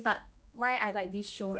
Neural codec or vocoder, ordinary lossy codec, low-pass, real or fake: codec, 16 kHz, 1 kbps, X-Codec, HuBERT features, trained on general audio; none; none; fake